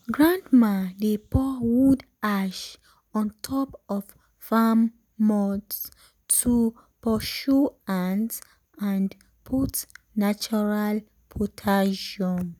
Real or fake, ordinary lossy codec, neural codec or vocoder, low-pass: real; none; none; 19.8 kHz